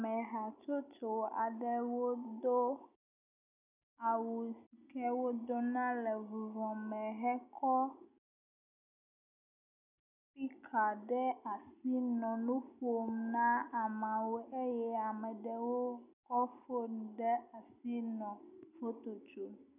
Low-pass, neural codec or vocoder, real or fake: 3.6 kHz; none; real